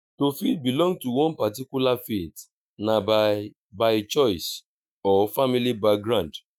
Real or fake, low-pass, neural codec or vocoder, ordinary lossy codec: fake; none; autoencoder, 48 kHz, 128 numbers a frame, DAC-VAE, trained on Japanese speech; none